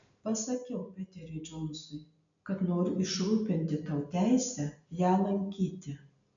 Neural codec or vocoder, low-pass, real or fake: none; 7.2 kHz; real